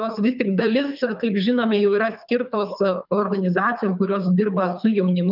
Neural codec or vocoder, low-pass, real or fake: codec, 24 kHz, 3 kbps, HILCodec; 5.4 kHz; fake